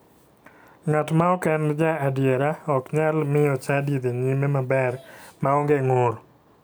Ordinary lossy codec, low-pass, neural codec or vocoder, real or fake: none; none; none; real